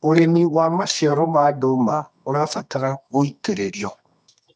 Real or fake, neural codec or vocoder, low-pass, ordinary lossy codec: fake; codec, 24 kHz, 0.9 kbps, WavTokenizer, medium music audio release; 10.8 kHz; none